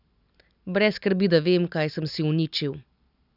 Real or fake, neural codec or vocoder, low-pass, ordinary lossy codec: real; none; 5.4 kHz; none